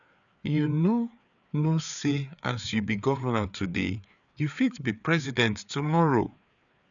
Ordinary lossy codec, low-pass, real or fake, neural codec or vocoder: none; 7.2 kHz; fake; codec, 16 kHz, 4 kbps, FreqCodec, larger model